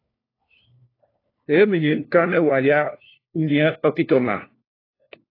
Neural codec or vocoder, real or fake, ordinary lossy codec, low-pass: codec, 16 kHz, 1 kbps, FunCodec, trained on LibriTTS, 50 frames a second; fake; AAC, 24 kbps; 5.4 kHz